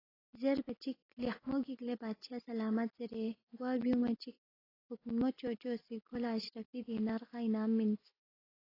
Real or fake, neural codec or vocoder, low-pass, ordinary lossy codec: real; none; 5.4 kHz; AAC, 24 kbps